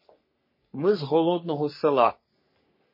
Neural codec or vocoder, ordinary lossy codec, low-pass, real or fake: codec, 44.1 kHz, 3.4 kbps, Pupu-Codec; MP3, 24 kbps; 5.4 kHz; fake